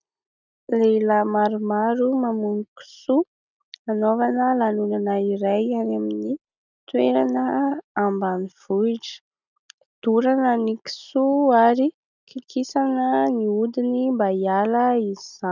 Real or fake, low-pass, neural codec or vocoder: real; 7.2 kHz; none